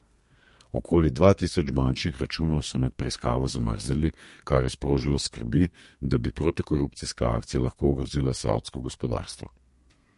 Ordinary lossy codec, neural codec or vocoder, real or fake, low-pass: MP3, 48 kbps; codec, 32 kHz, 1.9 kbps, SNAC; fake; 14.4 kHz